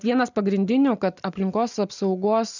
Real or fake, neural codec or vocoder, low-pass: real; none; 7.2 kHz